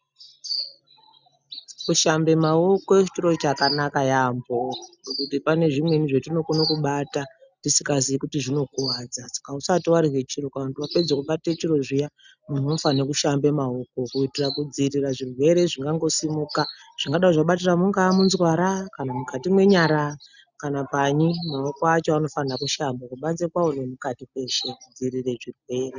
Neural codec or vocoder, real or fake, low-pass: none; real; 7.2 kHz